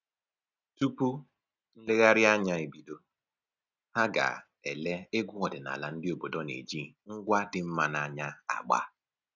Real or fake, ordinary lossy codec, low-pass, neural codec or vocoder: real; none; 7.2 kHz; none